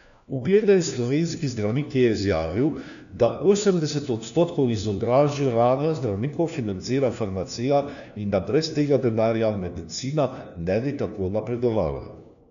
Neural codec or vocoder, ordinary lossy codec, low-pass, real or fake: codec, 16 kHz, 1 kbps, FunCodec, trained on LibriTTS, 50 frames a second; none; 7.2 kHz; fake